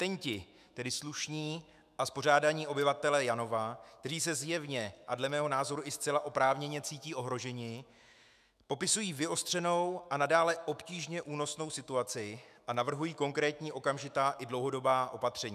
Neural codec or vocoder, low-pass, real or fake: autoencoder, 48 kHz, 128 numbers a frame, DAC-VAE, trained on Japanese speech; 14.4 kHz; fake